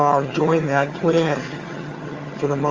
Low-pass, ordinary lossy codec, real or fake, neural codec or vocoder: 7.2 kHz; Opus, 32 kbps; fake; vocoder, 22.05 kHz, 80 mel bands, HiFi-GAN